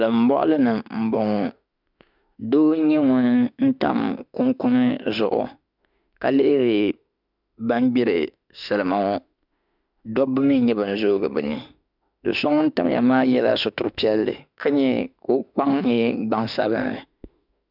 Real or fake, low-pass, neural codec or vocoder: fake; 5.4 kHz; autoencoder, 48 kHz, 32 numbers a frame, DAC-VAE, trained on Japanese speech